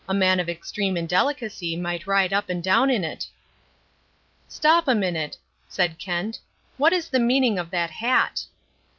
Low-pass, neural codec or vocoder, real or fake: 7.2 kHz; none; real